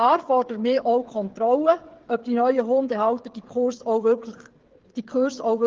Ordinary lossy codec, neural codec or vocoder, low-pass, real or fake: Opus, 16 kbps; codec, 16 kHz, 8 kbps, FreqCodec, smaller model; 7.2 kHz; fake